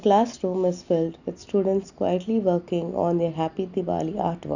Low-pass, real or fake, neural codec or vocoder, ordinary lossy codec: 7.2 kHz; real; none; none